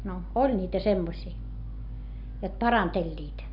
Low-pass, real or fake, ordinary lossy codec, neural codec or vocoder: 5.4 kHz; real; none; none